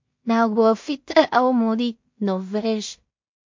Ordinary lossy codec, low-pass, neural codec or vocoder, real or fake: MP3, 48 kbps; 7.2 kHz; codec, 16 kHz in and 24 kHz out, 0.4 kbps, LongCat-Audio-Codec, two codebook decoder; fake